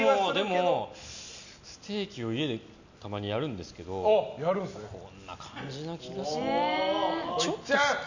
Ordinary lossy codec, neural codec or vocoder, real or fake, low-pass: none; none; real; 7.2 kHz